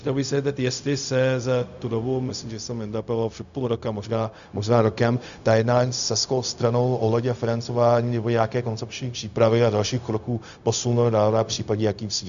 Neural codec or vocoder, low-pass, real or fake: codec, 16 kHz, 0.4 kbps, LongCat-Audio-Codec; 7.2 kHz; fake